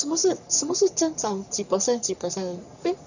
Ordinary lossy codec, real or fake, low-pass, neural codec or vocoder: none; fake; 7.2 kHz; codec, 44.1 kHz, 3.4 kbps, Pupu-Codec